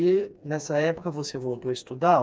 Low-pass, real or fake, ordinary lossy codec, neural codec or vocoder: none; fake; none; codec, 16 kHz, 2 kbps, FreqCodec, smaller model